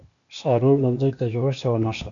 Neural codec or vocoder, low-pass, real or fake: codec, 16 kHz, 0.8 kbps, ZipCodec; 7.2 kHz; fake